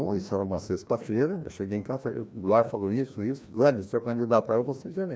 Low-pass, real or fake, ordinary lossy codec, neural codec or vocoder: none; fake; none; codec, 16 kHz, 1 kbps, FreqCodec, larger model